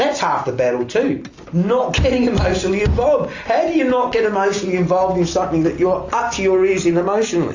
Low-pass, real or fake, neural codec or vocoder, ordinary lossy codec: 7.2 kHz; real; none; AAC, 48 kbps